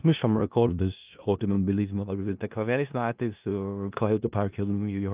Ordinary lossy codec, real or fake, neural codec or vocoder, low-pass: Opus, 24 kbps; fake; codec, 16 kHz in and 24 kHz out, 0.4 kbps, LongCat-Audio-Codec, four codebook decoder; 3.6 kHz